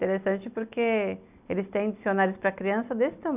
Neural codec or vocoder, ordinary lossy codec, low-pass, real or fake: none; none; 3.6 kHz; real